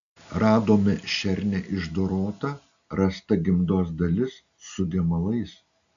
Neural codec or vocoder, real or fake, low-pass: none; real; 7.2 kHz